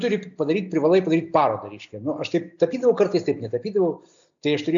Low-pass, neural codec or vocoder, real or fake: 7.2 kHz; none; real